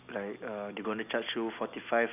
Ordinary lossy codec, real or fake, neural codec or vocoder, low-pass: none; real; none; 3.6 kHz